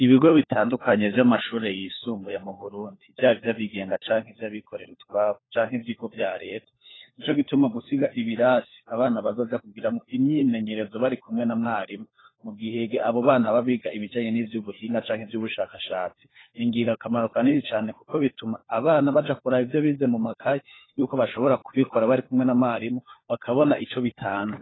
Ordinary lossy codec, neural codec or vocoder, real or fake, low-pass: AAC, 16 kbps; codec, 16 kHz, 4 kbps, FunCodec, trained on LibriTTS, 50 frames a second; fake; 7.2 kHz